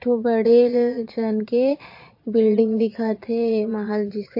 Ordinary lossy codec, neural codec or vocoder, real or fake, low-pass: MP3, 32 kbps; vocoder, 22.05 kHz, 80 mel bands, Vocos; fake; 5.4 kHz